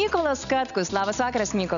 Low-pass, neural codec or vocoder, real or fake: 7.2 kHz; none; real